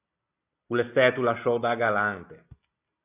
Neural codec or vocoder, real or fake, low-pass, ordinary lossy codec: none; real; 3.6 kHz; AAC, 32 kbps